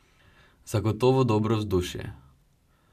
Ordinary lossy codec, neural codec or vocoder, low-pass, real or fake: none; none; 14.4 kHz; real